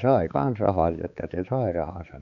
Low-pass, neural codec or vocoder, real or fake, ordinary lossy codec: 7.2 kHz; codec, 16 kHz, 4 kbps, X-Codec, HuBERT features, trained on balanced general audio; fake; none